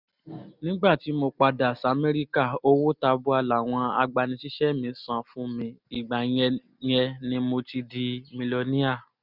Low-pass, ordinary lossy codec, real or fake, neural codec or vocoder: 5.4 kHz; none; real; none